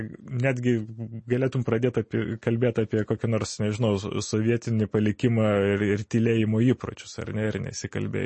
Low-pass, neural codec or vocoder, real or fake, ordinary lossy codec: 9.9 kHz; none; real; MP3, 32 kbps